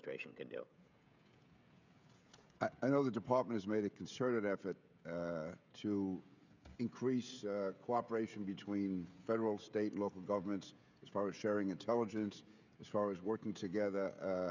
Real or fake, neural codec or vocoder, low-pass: fake; codec, 16 kHz, 16 kbps, FreqCodec, smaller model; 7.2 kHz